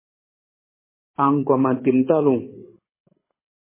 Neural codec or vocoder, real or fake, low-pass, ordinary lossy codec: codec, 24 kHz, 6 kbps, HILCodec; fake; 3.6 kHz; MP3, 16 kbps